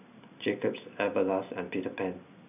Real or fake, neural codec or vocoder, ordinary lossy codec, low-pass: real; none; none; 3.6 kHz